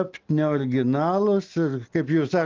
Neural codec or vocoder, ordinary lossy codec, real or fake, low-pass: none; Opus, 24 kbps; real; 7.2 kHz